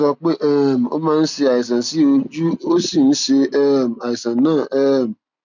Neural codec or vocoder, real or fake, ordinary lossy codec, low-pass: none; real; none; 7.2 kHz